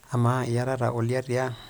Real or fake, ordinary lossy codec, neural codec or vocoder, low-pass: real; none; none; none